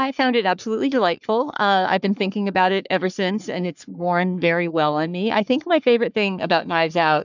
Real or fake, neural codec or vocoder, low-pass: fake; codec, 44.1 kHz, 3.4 kbps, Pupu-Codec; 7.2 kHz